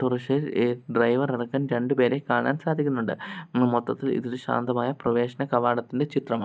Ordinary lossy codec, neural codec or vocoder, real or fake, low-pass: none; none; real; none